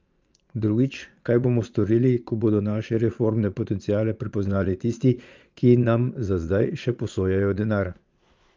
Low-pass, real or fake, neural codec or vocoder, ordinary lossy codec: 7.2 kHz; fake; vocoder, 22.05 kHz, 80 mel bands, Vocos; Opus, 24 kbps